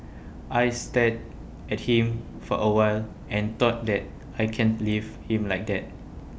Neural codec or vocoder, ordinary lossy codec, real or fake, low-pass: none; none; real; none